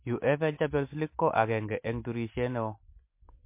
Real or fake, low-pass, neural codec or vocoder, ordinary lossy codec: real; 3.6 kHz; none; MP3, 24 kbps